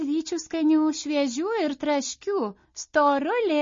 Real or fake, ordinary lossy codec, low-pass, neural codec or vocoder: real; MP3, 32 kbps; 7.2 kHz; none